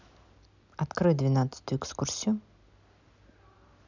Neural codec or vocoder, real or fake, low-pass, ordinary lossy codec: none; real; 7.2 kHz; none